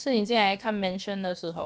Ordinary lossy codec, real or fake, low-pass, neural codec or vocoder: none; fake; none; codec, 16 kHz, about 1 kbps, DyCAST, with the encoder's durations